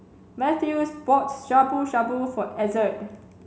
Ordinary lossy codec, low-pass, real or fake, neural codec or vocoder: none; none; real; none